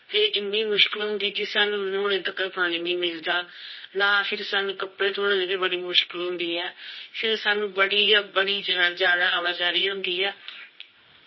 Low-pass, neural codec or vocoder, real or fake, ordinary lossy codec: 7.2 kHz; codec, 24 kHz, 0.9 kbps, WavTokenizer, medium music audio release; fake; MP3, 24 kbps